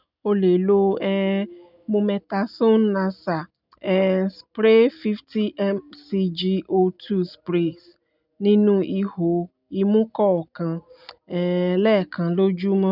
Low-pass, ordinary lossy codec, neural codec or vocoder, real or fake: 5.4 kHz; none; none; real